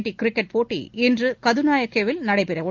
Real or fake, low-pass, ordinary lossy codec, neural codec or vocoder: real; 7.2 kHz; Opus, 24 kbps; none